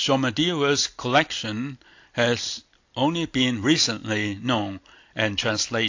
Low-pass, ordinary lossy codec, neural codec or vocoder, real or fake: 7.2 kHz; AAC, 48 kbps; none; real